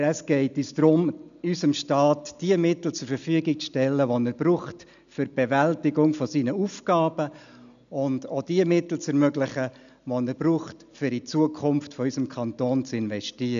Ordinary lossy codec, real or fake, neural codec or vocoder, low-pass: none; real; none; 7.2 kHz